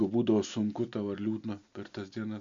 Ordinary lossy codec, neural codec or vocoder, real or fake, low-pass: MP3, 64 kbps; none; real; 7.2 kHz